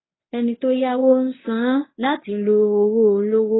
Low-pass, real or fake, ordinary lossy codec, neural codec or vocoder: 7.2 kHz; fake; AAC, 16 kbps; codec, 24 kHz, 0.9 kbps, WavTokenizer, medium speech release version 1